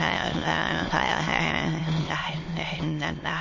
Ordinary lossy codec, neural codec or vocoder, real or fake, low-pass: MP3, 32 kbps; autoencoder, 22.05 kHz, a latent of 192 numbers a frame, VITS, trained on many speakers; fake; 7.2 kHz